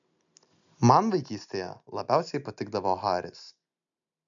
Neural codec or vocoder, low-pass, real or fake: none; 7.2 kHz; real